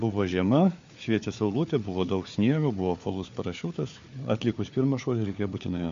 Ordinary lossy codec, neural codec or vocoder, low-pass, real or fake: MP3, 48 kbps; codec, 16 kHz, 4 kbps, FunCodec, trained on Chinese and English, 50 frames a second; 7.2 kHz; fake